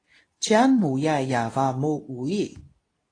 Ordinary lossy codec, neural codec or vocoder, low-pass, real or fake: AAC, 32 kbps; codec, 24 kHz, 0.9 kbps, WavTokenizer, medium speech release version 2; 9.9 kHz; fake